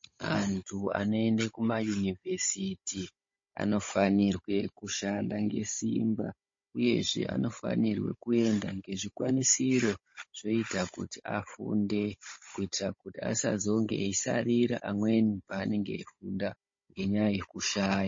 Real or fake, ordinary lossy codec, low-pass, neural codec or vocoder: fake; MP3, 32 kbps; 7.2 kHz; codec, 16 kHz, 16 kbps, FunCodec, trained on Chinese and English, 50 frames a second